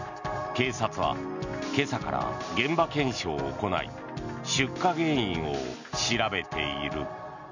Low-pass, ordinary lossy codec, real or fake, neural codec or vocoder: 7.2 kHz; none; real; none